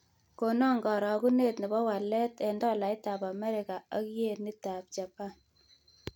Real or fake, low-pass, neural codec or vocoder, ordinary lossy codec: real; 19.8 kHz; none; none